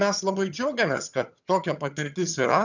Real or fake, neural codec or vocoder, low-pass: fake; vocoder, 22.05 kHz, 80 mel bands, HiFi-GAN; 7.2 kHz